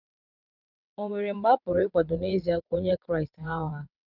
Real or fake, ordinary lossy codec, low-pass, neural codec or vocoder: fake; none; 5.4 kHz; vocoder, 22.05 kHz, 80 mel bands, WaveNeXt